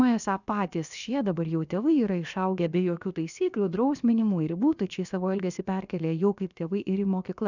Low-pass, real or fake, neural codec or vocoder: 7.2 kHz; fake; codec, 16 kHz, about 1 kbps, DyCAST, with the encoder's durations